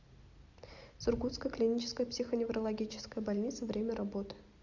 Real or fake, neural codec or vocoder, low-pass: real; none; 7.2 kHz